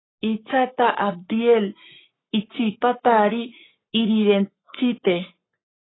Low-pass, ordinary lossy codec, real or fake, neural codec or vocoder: 7.2 kHz; AAC, 16 kbps; fake; codec, 44.1 kHz, 7.8 kbps, Pupu-Codec